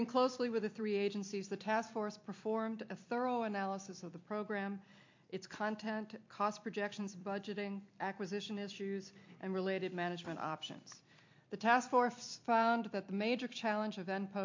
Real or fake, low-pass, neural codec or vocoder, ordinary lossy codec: fake; 7.2 kHz; vocoder, 44.1 kHz, 128 mel bands every 256 samples, BigVGAN v2; MP3, 48 kbps